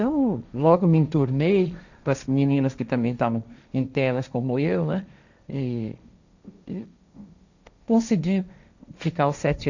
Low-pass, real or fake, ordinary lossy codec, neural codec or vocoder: 7.2 kHz; fake; none; codec, 16 kHz, 1.1 kbps, Voila-Tokenizer